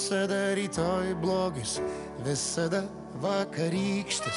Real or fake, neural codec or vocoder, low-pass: real; none; 10.8 kHz